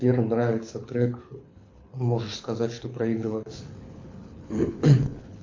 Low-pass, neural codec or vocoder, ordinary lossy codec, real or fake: 7.2 kHz; codec, 24 kHz, 6 kbps, HILCodec; MP3, 48 kbps; fake